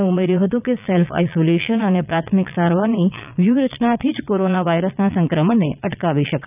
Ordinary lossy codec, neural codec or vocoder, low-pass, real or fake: none; vocoder, 22.05 kHz, 80 mel bands, Vocos; 3.6 kHz; fake